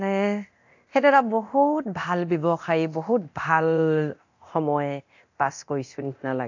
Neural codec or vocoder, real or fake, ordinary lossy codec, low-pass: codec, 24 kHz, 0.9 kbps, DualCodec; fake; AAC, 48 kbps; 7.2 kHz